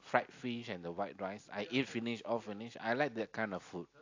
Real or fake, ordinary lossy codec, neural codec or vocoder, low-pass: real; AAC, 48 kbps; none; 7.2 kHz